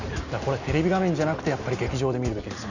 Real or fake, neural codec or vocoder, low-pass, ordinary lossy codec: real; none; 7.2 kHz; none